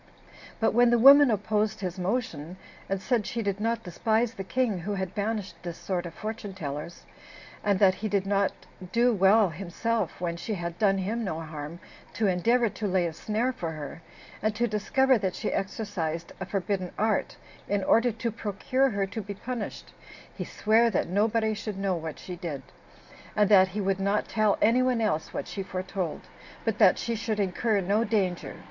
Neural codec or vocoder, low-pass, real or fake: none; 7.2 kHz; real